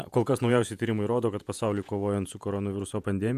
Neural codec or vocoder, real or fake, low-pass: vocoder, 48 kHz, 128 mel bands, Vocos; fake; 14.4 kHz